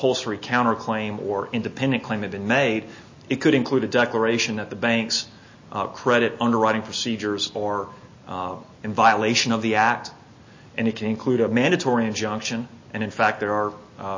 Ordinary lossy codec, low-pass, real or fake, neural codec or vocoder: MP3, 32 kbps; 7.2 kHz; real; none